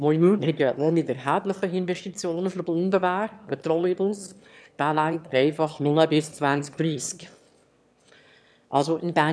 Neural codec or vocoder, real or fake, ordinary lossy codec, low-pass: autoencoder, 22.05 kHz, a latent of 192 numbers a frame, VITS, trained on one speaker; fake; none; none